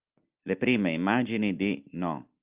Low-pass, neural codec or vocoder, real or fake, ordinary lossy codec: 3.6 kHz; none; real; Opus, 24 kbps